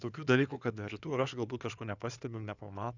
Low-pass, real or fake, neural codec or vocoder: 7.2 kHz; fake; codec, 16 kHz in and 24 kHz out, 2.2 kbps, FireRedTTS-2 codec